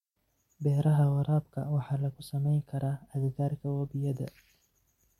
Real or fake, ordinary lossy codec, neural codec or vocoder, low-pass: fake; MP3, 64 kbps; vocoder, 44.1 kHz, 128 mel bands every 256 samples, BigVGAN v2; 19.8 kHz